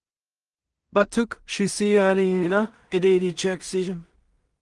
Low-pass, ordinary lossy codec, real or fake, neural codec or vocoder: 10.8 kHz; Opus, 24 kbps; fake; codec, 16 kHz in and 24 kHz out, 0.4 kbps, LongCat-Audio-Codec, two codebook decoder